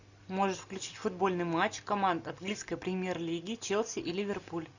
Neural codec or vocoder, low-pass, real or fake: none; 7.2 kHz; real